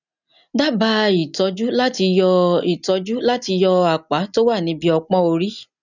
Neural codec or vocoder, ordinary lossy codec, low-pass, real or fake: none; none; 7.2 kHz; real